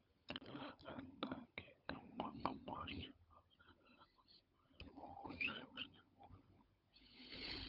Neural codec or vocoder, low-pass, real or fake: codec, 16 kHz, 16 kbps, FunCodec, trained on LibriTTS, 50 frames a second; 5.4 kHz; fake